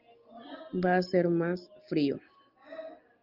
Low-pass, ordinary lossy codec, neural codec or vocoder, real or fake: 5.4 kHz; Opus, 24 kbps; none; real